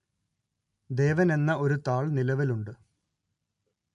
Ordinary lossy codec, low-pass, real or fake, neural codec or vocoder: MP3, 64 kbps; 10.8 kHz; real; none